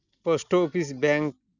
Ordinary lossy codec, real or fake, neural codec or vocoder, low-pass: none; real; none; 7.2 kHz